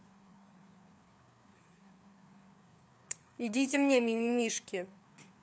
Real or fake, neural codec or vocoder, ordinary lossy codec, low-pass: fake; codec, 16 kHz, 4 kbps, FreqCodec, larger model; none; none